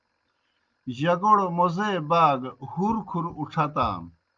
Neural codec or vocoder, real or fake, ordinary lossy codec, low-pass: none; real; Opus, 32 kbps; 7.2 kHz